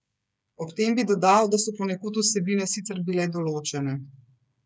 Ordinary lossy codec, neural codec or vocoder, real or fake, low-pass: none; codec, 16 kHz, 8 kbps, FreqCodec, smaller model; fake; none